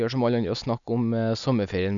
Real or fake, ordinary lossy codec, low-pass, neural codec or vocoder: real; none; 7.2 kHz; none